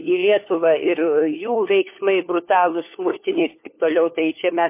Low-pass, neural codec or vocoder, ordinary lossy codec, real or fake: 3.6 kHz; codec, 16 kHz, 4 kbps, FunCodec, trained on LibriTTS, 50 frames a second; MP3, 24 kbps; fake